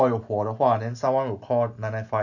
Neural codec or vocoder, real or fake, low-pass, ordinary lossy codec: none; real; 7.2 kHz; none